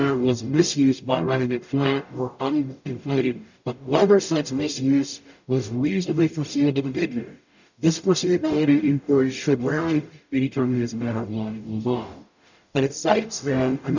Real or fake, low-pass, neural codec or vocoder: fake; 7.2 kHz; codec, 44.1 kHz, 0.9 kbps, DAC